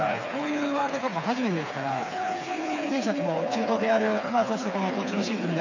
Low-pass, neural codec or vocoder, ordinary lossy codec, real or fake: 7.2 kHz; codec, 16 kHz, 4 kbps, FreqCodec, smaller model; AAC, 48 kbps; fake